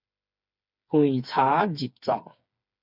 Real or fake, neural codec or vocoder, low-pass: fake; codec, 16 kHz, 4 kbps, FreqCodec, smaller model; 5.4 kHz